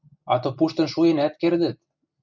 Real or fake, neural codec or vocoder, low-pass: real; none; 7.2 kHz